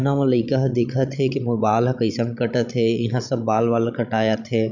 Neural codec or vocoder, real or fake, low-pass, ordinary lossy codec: codec, 16 kHz, 16 kbps, FreqCodec, larger model; fake; 7.2 kHz; none